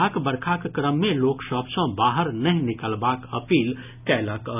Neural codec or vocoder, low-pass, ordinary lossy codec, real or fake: none; 3.6 kHz; none; real